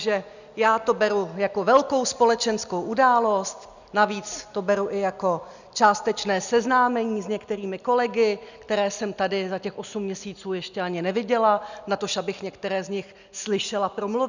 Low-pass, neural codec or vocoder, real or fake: 7.2 kHz; none; real